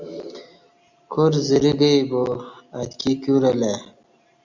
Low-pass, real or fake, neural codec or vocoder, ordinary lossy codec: 7.2 kHz; real; none; Opus, 64 kbps